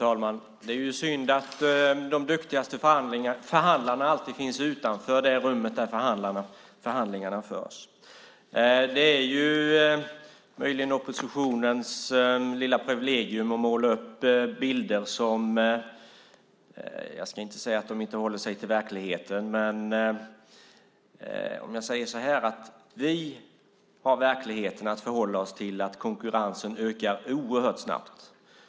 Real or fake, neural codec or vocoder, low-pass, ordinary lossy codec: real; none; none; none